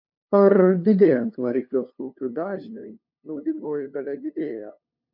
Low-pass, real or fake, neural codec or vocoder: 5.4 kHz; fake; codec, 16 kHz, 2 kbps, FunCodec, trained on LibriTTS, 25 frames a second